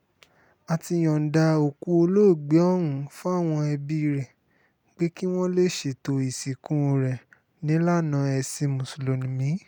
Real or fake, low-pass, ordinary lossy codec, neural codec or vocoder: real; none; none; none